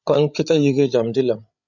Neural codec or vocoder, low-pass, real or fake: codec, 16 kHz, 8 kbps, FreqCodec, larger model; 7.2 kHz; fake